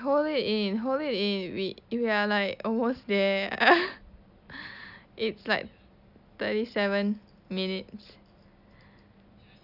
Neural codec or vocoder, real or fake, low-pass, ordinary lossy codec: none; real; 5.4 kHz; none